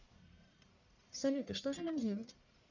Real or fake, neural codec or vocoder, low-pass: fake; codec, 44.1 kHz, 1.7 kbps, Pupu-Codec; 7.2 kHz